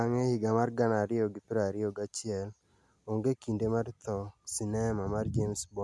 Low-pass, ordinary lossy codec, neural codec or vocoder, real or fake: none; none; none; real